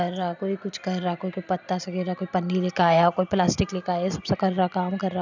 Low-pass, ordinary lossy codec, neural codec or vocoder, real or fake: 7.2 kHz; none; none; real